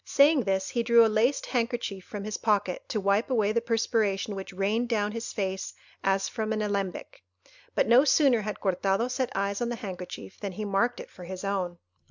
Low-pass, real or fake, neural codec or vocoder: 7.2 kHz; real; none